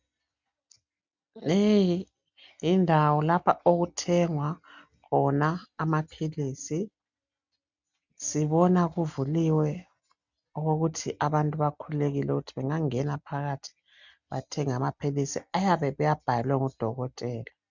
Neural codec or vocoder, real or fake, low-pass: none; real; 7.2 kHz